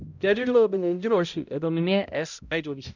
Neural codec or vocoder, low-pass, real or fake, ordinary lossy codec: codec, 16 kHz, 0.5 kbps, X-Codec, HuBERT features, trained on balanced general audio; 7.2 kHz; fake; none